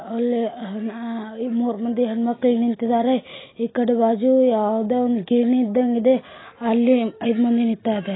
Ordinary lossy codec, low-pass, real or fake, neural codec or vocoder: AAC, 16 kbps; 7.2 kHz; real; none